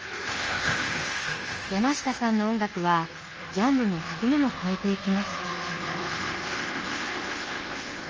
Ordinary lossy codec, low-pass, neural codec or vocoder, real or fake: Opus, 24 kbps; 7.2 kHz; codec, 24 kHz, 1.2 kbps, DualCodec; fake